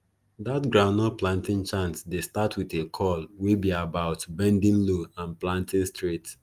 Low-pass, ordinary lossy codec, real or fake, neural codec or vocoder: 14.4 kHz; Opus, 32 kbps; real; none